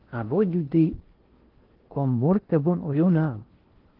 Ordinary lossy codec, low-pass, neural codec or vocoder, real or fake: Opus, 16 kbps; 5.4 kHz; codec, 16 kHz in and 24 kHz out, 0.6 kbps, FocalCodec, streaming, 4096 codes; fake